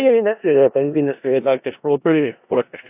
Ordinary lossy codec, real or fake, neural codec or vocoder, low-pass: AAC, 32 kbps; fake; codec, 16 kHz in and 24 kHz out, 0.4 kbps, LongCat-Audio-Codec, four codebook decoder; 3.6 kHz